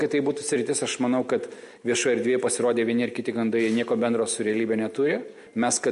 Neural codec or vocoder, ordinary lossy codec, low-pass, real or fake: none; MP3, 48 kbps; 10.8 kHz; real